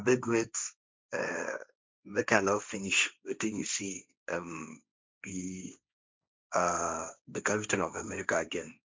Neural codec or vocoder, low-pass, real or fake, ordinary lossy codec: codec, 16 kHz, 1.1 kbps, Voila-Tokenizer; none; fake; none